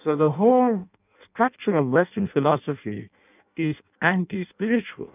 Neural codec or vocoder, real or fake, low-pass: codec, 16 kHz in and 24 kHz out, 0.6 kbps, FireRedTTS-2 codec; fake; 3.6 kHz